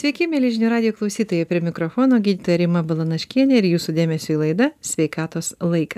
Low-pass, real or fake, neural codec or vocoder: 14.4 kHz; real; none